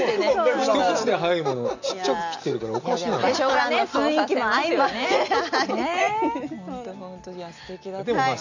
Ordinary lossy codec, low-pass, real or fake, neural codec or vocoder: none; 7.2 kHz; real; none